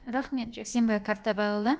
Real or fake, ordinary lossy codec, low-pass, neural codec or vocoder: fake; none; none; codec, 16 kHz, about 1 kbps, DyCAST, with the encoder's durations